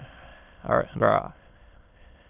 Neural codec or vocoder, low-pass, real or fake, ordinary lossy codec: autoencoder, 22.05 kHz, a latent of 192 numbers a frame, VITS, trained on many speakers; 3.6 kHz; fake; none